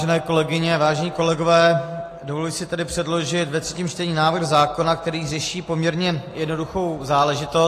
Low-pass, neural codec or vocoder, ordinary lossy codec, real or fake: 14.4 kHz; none; AAC, 48 kbps; real